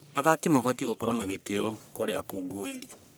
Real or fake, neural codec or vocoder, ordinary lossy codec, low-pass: fake; codec, 44.1 kHz, 1.7 kbps, Pupu-Codec; none; none